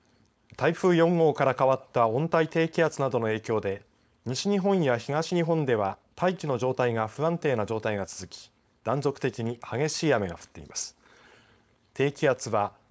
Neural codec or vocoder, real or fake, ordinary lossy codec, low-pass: codec, 16 kHz, 4.8 kbps, FACodec; fake; none; none